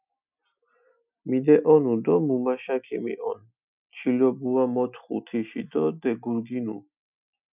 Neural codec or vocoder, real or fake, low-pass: none; real; 3.6 kHz